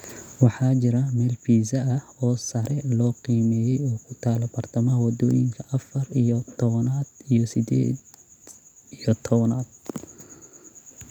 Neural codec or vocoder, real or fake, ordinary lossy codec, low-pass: none; real; none; 19.8 kHz